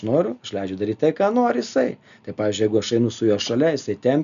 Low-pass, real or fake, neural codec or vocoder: 7.2 kHz; real; none